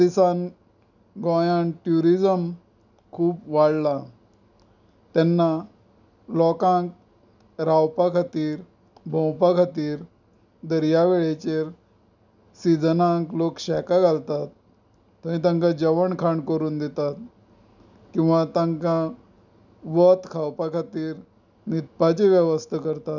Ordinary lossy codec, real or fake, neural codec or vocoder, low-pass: none; real; none; 7.2 kHz